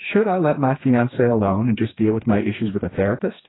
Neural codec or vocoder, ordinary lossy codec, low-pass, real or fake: codec, 16 kHz, 2 kbps, FreqCodec, smaller model; AAC, 16 kbps; 7.2 kHz; fake